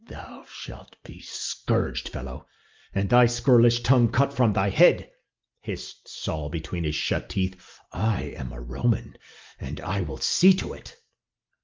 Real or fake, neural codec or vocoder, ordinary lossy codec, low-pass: real; none; Opus, 24 kbps; 7.2 kHz